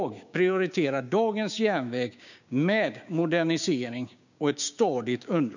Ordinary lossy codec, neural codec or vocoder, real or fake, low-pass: none; codec, 16 kHz, 6 kbps, DAC; fake; 7.2 kHz